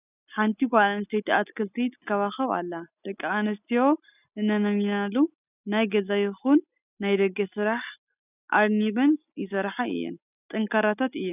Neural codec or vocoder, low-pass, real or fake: none; 3.6 kHz; real